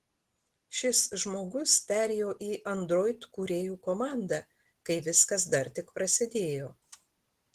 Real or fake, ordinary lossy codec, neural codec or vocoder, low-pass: real; Opus, 16 kbps; none; 14.4 kHz